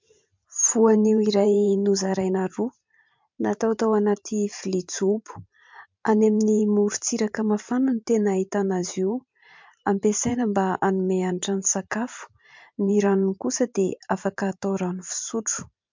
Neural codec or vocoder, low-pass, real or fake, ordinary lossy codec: vocoder, 44.1 kHz, 128 mel bands every 512 samples, BigVGAN v2; 7.2 kHz; fake; MP3, 64 kbps